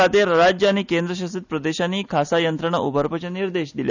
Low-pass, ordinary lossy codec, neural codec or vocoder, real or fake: 7.2 kHz; none; none; real